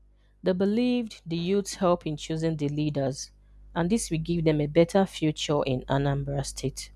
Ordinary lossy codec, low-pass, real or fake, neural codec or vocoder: none; none; real; none